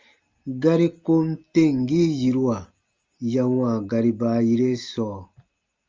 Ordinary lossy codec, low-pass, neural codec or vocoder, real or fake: Opus, 32 kbps; 7.2 kHz; none; real